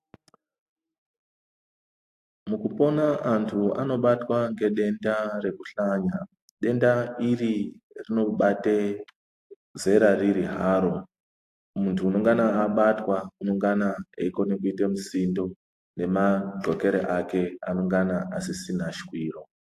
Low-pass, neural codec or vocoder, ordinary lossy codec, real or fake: 14.4 kHz; none; AAC, 64 kbps; real